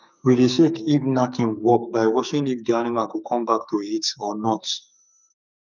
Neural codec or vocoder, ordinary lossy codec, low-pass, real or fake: codec, 44.1 kHz, 2.6 kbps, SNAC; none; 7.2 kHz; fake